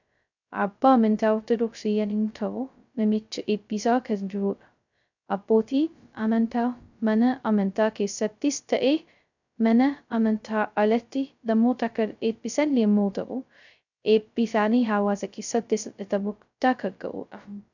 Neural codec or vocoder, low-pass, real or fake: codec, 16 kHz, 0.2 kbps, FocalCodec; 7.2 kHz; fake